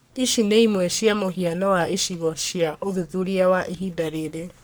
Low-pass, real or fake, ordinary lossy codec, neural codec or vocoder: none; fake; none; codec, 44.1 kHz, 3.4 kbps, Pupu-Codec